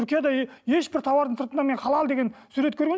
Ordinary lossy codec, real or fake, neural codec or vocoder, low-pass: none; real; none; none